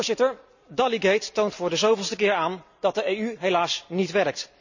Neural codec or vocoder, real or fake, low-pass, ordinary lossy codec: none; real; 7.2 kHz; none